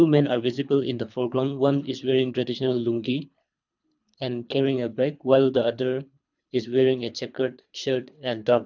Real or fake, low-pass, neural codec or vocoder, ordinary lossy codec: fake; 7.2 kHz; codec, 24 kHz, 3 kbps, HILCodec; none